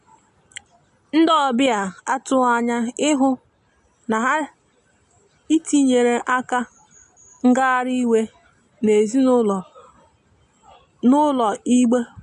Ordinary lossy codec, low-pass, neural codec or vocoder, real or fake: MP3, 48 kbps; 14.4 kHz; none; real